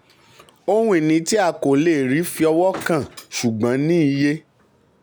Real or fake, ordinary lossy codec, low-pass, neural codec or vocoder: real; none; none; none